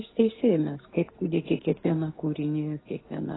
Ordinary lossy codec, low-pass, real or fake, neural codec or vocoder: AAC, 16 kbps; 7.2 kHz; real; none